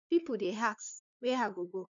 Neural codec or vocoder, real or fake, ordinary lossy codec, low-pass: codec, 16 kHz, 2 kbps, FunCodec, trained on LibriTTS, 25 frames a second; fake; none; 7.2 kHz